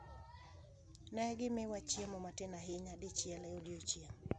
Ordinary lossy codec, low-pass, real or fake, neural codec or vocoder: none; 9.9 kHz; real; none